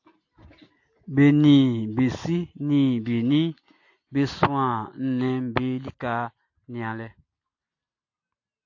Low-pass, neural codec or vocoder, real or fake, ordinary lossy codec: 7.2 kHz; none; real; AAC, 48 kbps